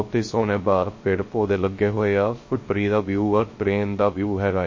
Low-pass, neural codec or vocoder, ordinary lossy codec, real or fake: 7.2 kHz; codec, 16 kHz, 0.3 kbps, FocalCodec; MP3, 32 kbps; fake